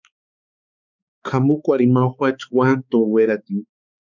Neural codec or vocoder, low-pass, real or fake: codec, 16 kHz, 4 kbps, X-Codec, HuBERT features, trained on balanced general audio; 7.2 kHz; fake